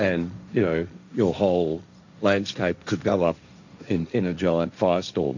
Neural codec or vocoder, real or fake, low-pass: codec, 16 kHz, 1.1 kbps, Voila-Tokenizer; fake; 7.2 kHz